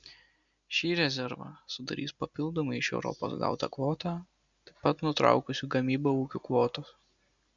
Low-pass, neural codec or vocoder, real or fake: 7.2 kHz; none; real